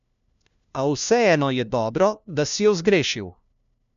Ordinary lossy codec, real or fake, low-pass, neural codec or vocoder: none; fake; 7.2 kHz; codec, 16 kHz, 1 kbps, FunCodec, trained on LibriTTS, 50 frames a second